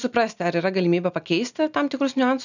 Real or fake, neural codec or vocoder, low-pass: real; none; 7.2 kHz